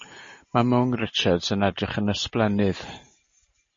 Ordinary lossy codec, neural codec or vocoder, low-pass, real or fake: MP3, 32 kbps; none; 7.2 kHz; real